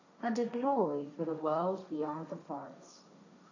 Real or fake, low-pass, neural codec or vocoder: fake; 7.2 kHz; codec, 16 kHz, 1.1 kbps, Voila-Tokenizer